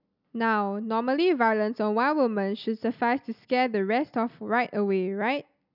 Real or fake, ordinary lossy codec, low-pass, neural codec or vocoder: real; none; 5.4 kHz; none